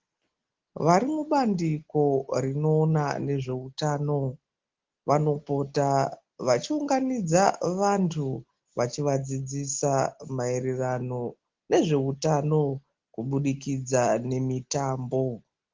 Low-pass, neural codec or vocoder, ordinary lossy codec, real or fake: 7.2 kHz; none; Opus, 16 kbps; real